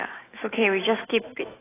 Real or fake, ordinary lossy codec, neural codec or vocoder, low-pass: fake; AAC, 16 kbps; autoencoder, 48 kHz, 128 numbers a frame, DAC-VAE, trained on Japanese speech; 3.6 kHz